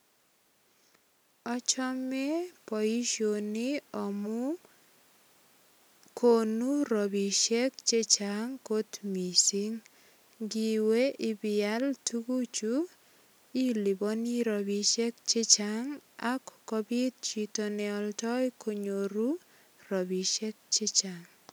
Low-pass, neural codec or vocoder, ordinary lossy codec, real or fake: none; none; none; real